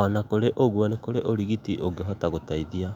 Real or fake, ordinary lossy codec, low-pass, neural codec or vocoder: fake; none; 19.8 kHz; autoencoder, 48 kHz, 128 numbers a frame, DAC-VAE, trained on Japanese speech